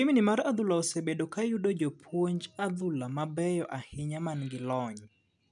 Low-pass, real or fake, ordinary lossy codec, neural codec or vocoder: 10.8 kHz; real; none; none